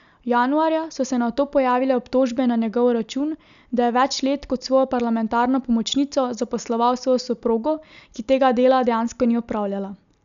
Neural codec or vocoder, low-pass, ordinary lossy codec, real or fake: none; 7.2 kHz; none; real